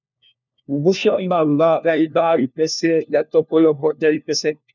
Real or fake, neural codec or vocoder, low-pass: fake; codec, 16 kHz, 1 kbps, FunCodec, trained on LibriTTS, 50 frames a second; 7.2 kHz